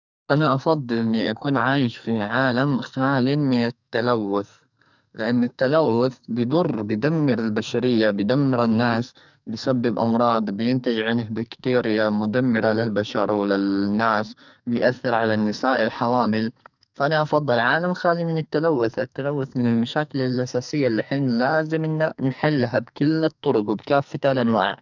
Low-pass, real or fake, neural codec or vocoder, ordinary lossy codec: 7.2 kHz; fake; codec, 44.1 kHz, 2.6 kbps, SNAC; none